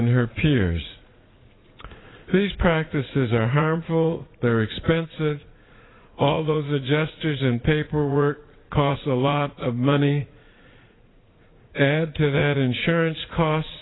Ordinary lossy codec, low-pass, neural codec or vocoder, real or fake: AAC, 16 kbps; 7.2 kHz; vocoder, 44.1 kHz, 80 mel bands, Vocos; fake